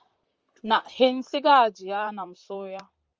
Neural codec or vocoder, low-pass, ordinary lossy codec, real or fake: vocoder, 44.1 kHz, 128 mel bands, Pupu-Vocoder; 7.2 kHz; Opus, 24 kbps; fake